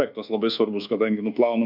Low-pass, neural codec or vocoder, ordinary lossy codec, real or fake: 5.4 kHz; codec, 24 kHz, 1.2 kbps, DualCodec; MP3, 48 kbps; fake